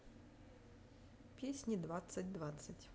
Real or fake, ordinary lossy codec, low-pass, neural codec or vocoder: real; none; none; none